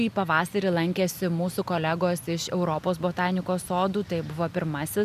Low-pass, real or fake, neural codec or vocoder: 14.4 kHz; real; none